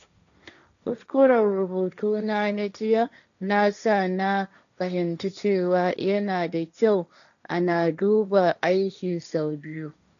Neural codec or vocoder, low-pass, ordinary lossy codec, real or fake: codec, 16 kHz, 1.1 kbps, Voila-Tokenizer; 7.2 kHz; none; fake